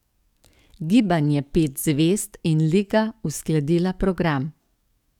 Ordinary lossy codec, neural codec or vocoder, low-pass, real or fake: none; codec, 44.1 kHz, 7.8 kbps, DAC; 19.8 kHz; fake